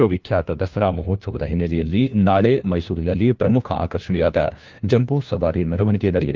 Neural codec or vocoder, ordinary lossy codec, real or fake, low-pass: codec, 16 kHz, 1 kbps, FunCodec, trained on LibriTTS, 50 frames a second; Opus, 24 kbps; fake; 7.2 kHz